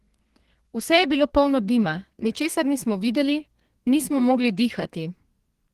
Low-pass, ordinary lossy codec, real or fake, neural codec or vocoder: 14.4 kHz; Opus, 16 kbps; fake; codec, 32 kHz, 1.9 kbps, SNAC